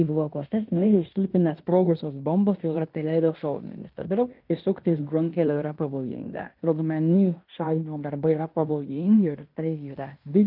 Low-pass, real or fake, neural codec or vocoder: 5.4 kHz; fake; codec, 16 kHz in and 24 kHz out, 0.9 kbps, LongCat-Audio-Codec, fine tuned four codebook decoder